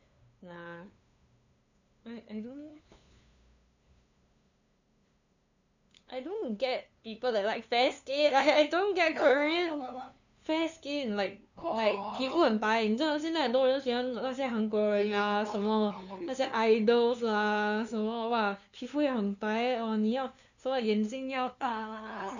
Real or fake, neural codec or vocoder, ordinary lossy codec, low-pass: fake; codec, 16 kHz, 2 kbps, FunCodec, trained on LibriTTS, 25 frames a second; none; 7.2 kHz